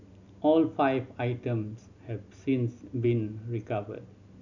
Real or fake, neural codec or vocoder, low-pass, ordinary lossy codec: real; none; 7.2 kHz; none